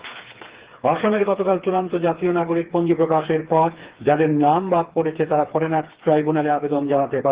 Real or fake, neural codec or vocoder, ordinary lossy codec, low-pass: fake; codec, 16 kHz, 4 kbps, FreqCodec, smaller model; Opus, 16 kbps; 3.6 kHz